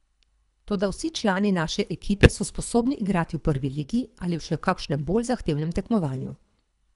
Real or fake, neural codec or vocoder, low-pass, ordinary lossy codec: fake; codec, 24 kHz, 3 kbps, HILCodec; 10.8 kHz; none